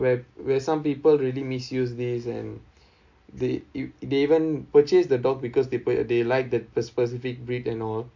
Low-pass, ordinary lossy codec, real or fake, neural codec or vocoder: 7.2 kHz; MP3, 48 kbps; real; none